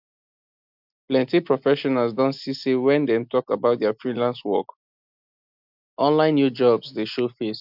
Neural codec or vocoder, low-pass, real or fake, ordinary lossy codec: none; 5.4 kHz; real; AAC, 48 kbps